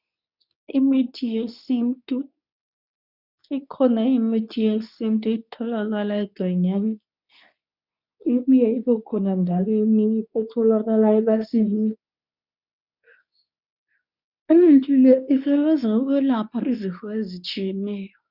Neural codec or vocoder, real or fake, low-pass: codec, 24 kHz, 0.9 kbps, WavTokenizer, medium speech release version 2; fake; 5.4 kHz